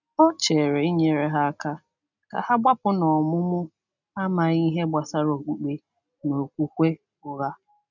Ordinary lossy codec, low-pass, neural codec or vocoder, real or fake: none; 7.2 kHz; none; real